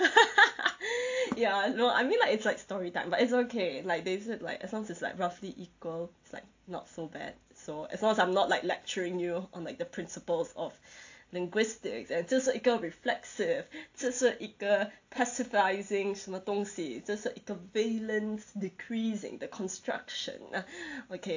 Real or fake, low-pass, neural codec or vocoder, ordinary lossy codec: real; 7.2 kHz; none; AAC, 48 kbps